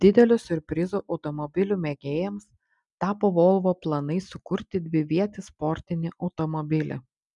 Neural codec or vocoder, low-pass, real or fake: none; 10.8 kHz; real